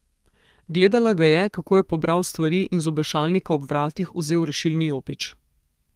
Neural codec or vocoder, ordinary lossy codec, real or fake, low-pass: codec, 32 kHz, 1.9 kbps, SNAC; Opus, 32 kbps; fake; 14.4 kHz